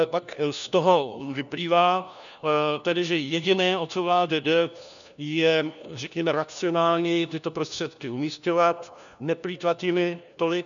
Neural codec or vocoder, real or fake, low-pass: codec, 16 kHz, 1 kbps, FunCodec, trained on LibriTTS, 50 frames a second; fake; 7.2 kHz